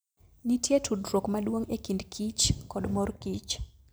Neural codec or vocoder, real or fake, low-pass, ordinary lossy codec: none; real; none; none